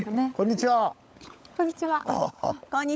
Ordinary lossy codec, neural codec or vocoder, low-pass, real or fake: none; codec, 16 kHz, 16 kbps, FunCodec, trained on LibriTTS, 50 frames a second; none; fake